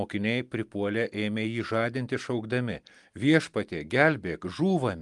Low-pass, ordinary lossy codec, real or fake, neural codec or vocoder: 10.8 kHz; Opus, 32 kbps; real; none